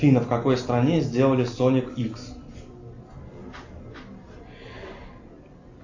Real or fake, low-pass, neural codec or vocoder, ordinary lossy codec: real; 7.2 kHz; none; AAC, 48 kbps